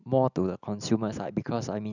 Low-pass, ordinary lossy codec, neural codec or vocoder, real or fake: 7.2 kHz; none; none; real